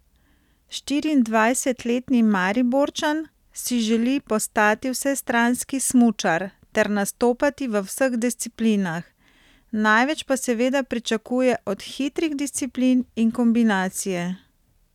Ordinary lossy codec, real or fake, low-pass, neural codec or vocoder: none; real; 19.8 kHz; none